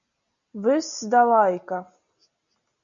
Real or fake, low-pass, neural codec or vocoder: real; 7.2 kHz; none